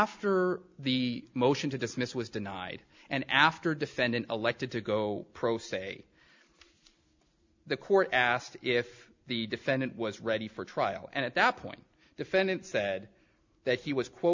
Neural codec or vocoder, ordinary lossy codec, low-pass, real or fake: none; MP3, 64 kbps; 7.2 kHz; real